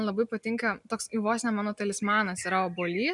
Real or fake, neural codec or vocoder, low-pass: real; none; 10.8 kHz